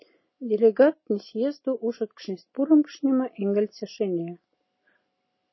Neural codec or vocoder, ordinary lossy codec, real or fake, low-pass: none; MP3, 24 kbps; real; 7.2 kHz